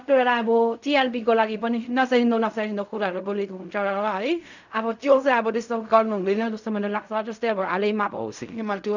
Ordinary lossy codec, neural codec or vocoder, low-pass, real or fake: none; codec, 16 kHz in and 24 kHz out, 0.4 kbps, LongCat-Audio-Codec, fine tuned four codebook decoder; 7.2 kHz; fake